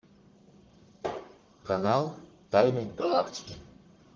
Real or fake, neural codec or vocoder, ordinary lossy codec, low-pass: fake; codec, 44.1 kHz, 1.7 kbps, Pupu-Codec; Opus, 32 kbps; 7.2 kHz